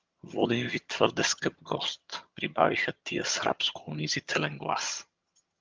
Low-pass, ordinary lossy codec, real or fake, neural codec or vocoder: 7.2 kHz; Opus, 32 kbps; fake; vocoder, 22.05 kHz, 80 mel bands, HiFi-GAN